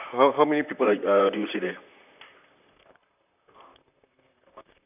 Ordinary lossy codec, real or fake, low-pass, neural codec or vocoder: none; fake; 3.6 kHz; vocoder, 44.1 kHz, 128 mel bands, Pupu-Vocoder